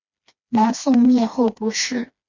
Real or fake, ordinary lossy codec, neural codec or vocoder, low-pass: fake; MP3, 64 kbps; codec, 16 kHz, 2 kbps, FreqCodec, smaller model; 7.2 kHz